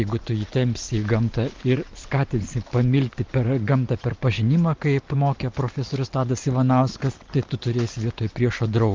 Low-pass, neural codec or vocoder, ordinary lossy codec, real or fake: 7.2 kHz; none; Opus, 32 kbps; real